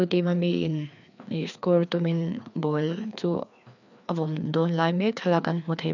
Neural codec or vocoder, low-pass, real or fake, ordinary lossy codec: codec, 16 kHz, 2 kbps, FreqCodec, larger model; 7.2 kHz; fake; none